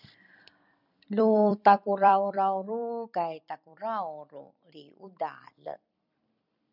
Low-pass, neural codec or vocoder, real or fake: 5.4 kHz; vocoder, 44.1 kHz, 128 mel bands every 256 samples, BigVGAN v2; fake